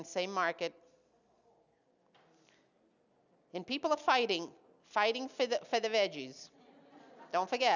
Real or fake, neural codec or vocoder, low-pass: real; none; 7.2 kHz